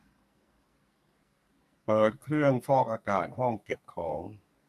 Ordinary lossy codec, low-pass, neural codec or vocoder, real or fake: MP3, 96 kbps; 14.4 kHz; codec, 44.1 kHz, 2.6 kbps, SNAC; fake